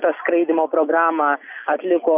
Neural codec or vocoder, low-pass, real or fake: none; 3.6 kHz; real